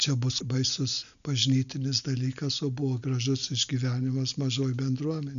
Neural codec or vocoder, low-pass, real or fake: none; 7.2 kHz; real